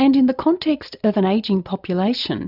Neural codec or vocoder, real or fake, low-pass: none; real; 5.4 kHz